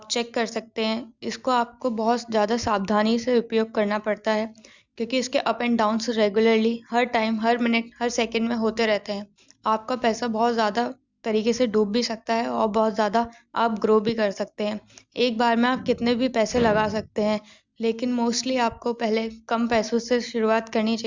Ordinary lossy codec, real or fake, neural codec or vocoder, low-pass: Opus, 64 kbps; real; none; 7.2 kHz